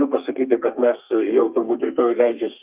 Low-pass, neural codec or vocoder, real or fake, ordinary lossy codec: 3.6 kHz; codec, 32 kHz, 1.9 kbps, SNAC; fake; Opus, 24 kbps